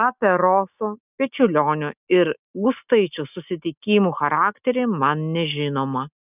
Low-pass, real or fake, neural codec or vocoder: 3.6 kHz; real; none